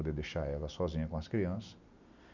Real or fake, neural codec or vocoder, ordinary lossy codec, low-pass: real; none; none; 7.2 kHz